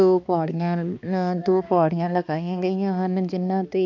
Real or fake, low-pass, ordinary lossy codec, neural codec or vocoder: fake; 7.2 kHz; none; codec, 16 kHz, 2 kbps, X-Codec, HuBERT features, trained on balanced general audio